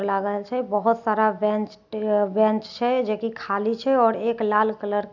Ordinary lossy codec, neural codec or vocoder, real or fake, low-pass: none; none; real; 7.2 kHz